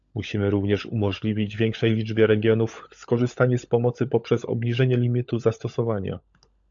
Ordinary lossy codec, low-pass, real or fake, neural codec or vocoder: AAC, 64 kbps; 7.2 kHz; fake; codec, 16 kHz, 16 kbps, FunCodec, trained on LibriTTS, 50 frames a second